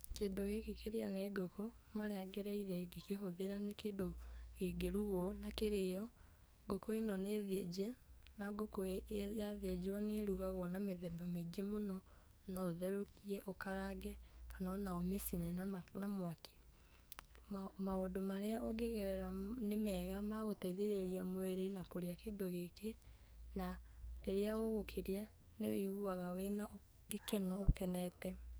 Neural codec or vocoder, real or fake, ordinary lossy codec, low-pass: codec, 44.1 kHz, 2.6 kbps, SNAC; fake; none; none